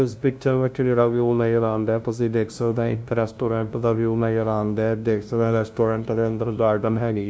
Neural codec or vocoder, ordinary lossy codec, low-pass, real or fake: codec, 16 kHz, 0.5 kbps, FunCodec, trained on LibriTTS, 25 frames a second; none; none; fake